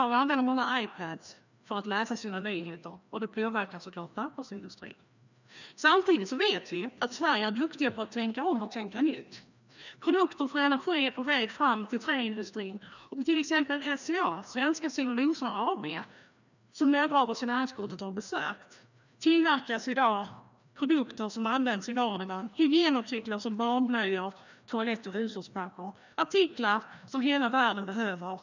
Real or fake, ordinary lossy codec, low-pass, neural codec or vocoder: fake; none; 7.2 kHz; codec, 16 kHz, 1 kbps, FreqCodec, larger model